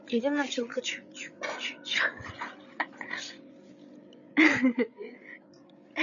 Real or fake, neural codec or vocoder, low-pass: fake; codec, 16 kHz, 8 kbps, FreqCodec, larger model; 7.2 kHz